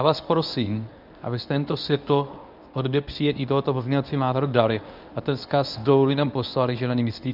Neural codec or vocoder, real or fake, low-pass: codec, 24 kHz, 0.9 kbps, WavTokenizer, medium speech release version 1; fake; 5.4 kHz